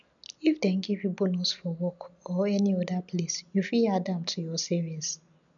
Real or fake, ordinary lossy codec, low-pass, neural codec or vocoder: real; none; 7.2 kHz; none